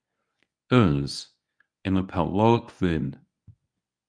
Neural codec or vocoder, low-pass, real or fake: codec, 24 kHz, 0.9 kbps, WavTokenizer, medium speech release version 1; 9.9 kHz; fake